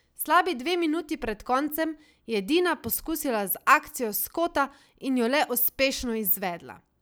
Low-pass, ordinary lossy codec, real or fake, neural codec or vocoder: none; none; real; none